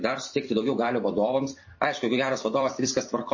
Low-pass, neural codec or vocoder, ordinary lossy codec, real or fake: 7.2 kHz; vocoder, 44.1 kHz, 128 mel bands every 256 samples, BigVGAN v2; MP3, 32 kbps; fake